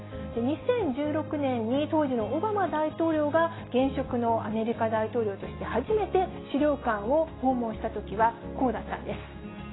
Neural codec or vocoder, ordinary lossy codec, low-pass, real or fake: none; AAC, 16 kbps; 7.2 kHz; real